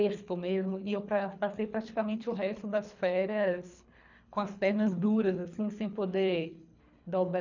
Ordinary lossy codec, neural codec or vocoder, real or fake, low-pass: none; codec, 24 kHz, 3 kbps, HILCodec; fake; 7.2 kHz